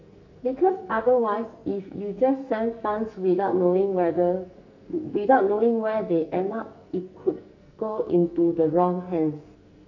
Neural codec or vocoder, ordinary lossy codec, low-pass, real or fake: codec, 44.1 kHz, 2.6 kbps, SNAC; none; 7.2 kHz; fake